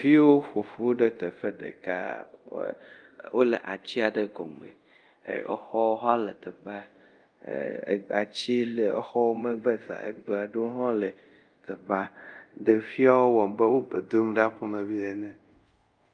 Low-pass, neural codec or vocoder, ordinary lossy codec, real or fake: 9.9 kHz; codec, 24 kHz, 0.5 kbps, DualCodec; Opus, 32 kbps; fake